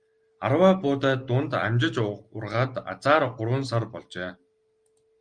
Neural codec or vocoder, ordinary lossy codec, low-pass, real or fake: none; Opus, 32 kbps; 9.9 kHz; real